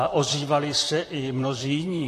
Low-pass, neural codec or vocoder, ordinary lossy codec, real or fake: 14.4 kHz; vocoder, 44.1 kHz, 128 mel bands, Pupu-Vocoder; AAC, 48 kbps; fake